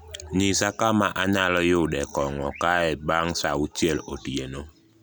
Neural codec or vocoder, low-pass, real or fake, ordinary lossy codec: none; none; real; none